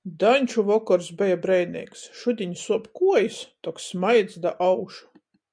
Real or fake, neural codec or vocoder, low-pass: real; none; 9.9 kHz